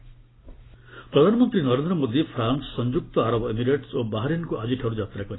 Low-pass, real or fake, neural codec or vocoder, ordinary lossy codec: 7.2 kHz; real; none; AAC, 16 kbps